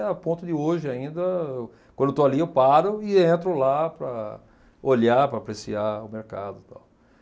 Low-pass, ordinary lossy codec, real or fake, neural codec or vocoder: none; none; real; none